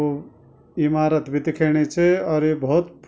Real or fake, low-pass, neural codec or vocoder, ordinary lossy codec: real; none; none; none